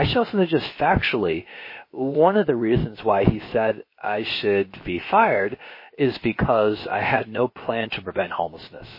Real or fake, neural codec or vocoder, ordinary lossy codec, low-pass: fake; codec, 16 kHz, about 1 kbps, DyCAST, with the encoder's durations; MP3, 24 kbps; 5.4 kHz